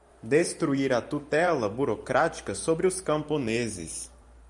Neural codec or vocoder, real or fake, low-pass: vocoder, 44.1 kHz, 128 mel bands every 512 samples, BigVGAN v2; fake; 10.8 kHz